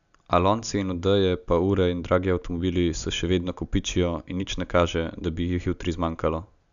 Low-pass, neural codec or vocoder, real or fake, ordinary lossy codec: 7.2 kHz; none; real; none